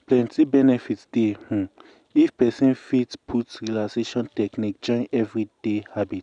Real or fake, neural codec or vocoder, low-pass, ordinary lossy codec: real; none; 9.9 kHz; none